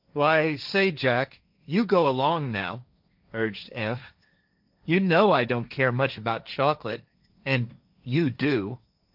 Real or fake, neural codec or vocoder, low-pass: fake; codec, 16 kHz, 1.1 kbps, Voila-Tokenizer; 5.4 kHz